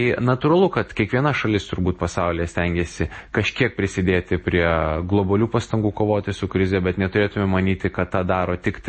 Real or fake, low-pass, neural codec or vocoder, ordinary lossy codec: real; 10.8 kHz; none; MP3, 32 kbps